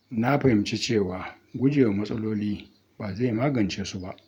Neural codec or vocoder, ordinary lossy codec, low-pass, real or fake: vocoder, 44.1 kHz, 128 mel bands every 512 samples, BigVGAN v2; none; 19.8 kHz; fake